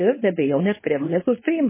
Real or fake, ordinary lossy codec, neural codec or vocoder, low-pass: fake; MP3, 16 kbps; codec, 24 kHz, 0.9 kbps, WavTokenizer, medium speech release version 2; 3.6 kHz